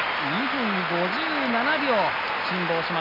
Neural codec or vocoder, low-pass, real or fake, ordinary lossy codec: none; 5.4 kHz; real; none